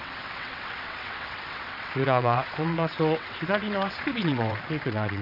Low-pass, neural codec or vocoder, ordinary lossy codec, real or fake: 5.4 kHz; vocoder, 22.05 kHz, 80 mel bands, WaveNeXt; none; fake